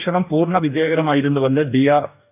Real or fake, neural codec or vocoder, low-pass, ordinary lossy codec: fake; codec, 44.1 kHz, 2.6 kbps, DAC; 3.6 kHz; none